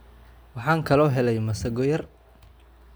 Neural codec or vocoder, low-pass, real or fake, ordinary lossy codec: none; none; real; none